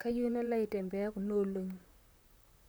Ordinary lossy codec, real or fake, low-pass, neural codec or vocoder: none; fake; none; vocoder, 44.1 kHz, 128 mel bands, Pupu-Vocoder